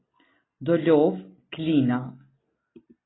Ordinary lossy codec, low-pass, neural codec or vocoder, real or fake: AAC, 16 kbps; 7.2 kHz; none; real